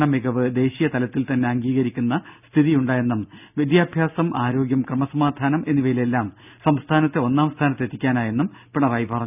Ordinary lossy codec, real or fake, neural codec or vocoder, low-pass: none; real; none; 3.6 kHz